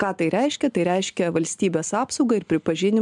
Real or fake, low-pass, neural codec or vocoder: real; 10.8 kHz; none